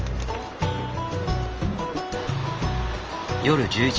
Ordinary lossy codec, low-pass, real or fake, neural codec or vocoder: Opus, 24 kbps; 7.2 kHz; real; none